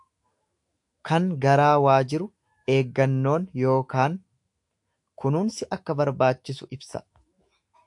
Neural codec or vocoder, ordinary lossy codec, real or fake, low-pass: autoencoder, 48 kHz, 128 numbers a frame, DAC-VAE, trained on Japanese speech; AAC, 64 kbps; fake; 10.8 kHz